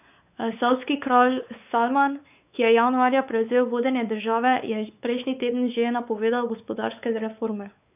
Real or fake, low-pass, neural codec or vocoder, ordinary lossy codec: fake; 3.6 kHz; codec, 16 kHz, 4 kbps, X-Codec, WavLM features, trained on Multilingual LibriSpeech; none